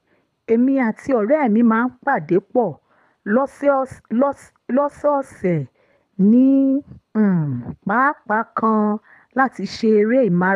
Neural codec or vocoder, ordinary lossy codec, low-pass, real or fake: codec, 24 kHz, 6 kbps, HILCodec; none; none; fake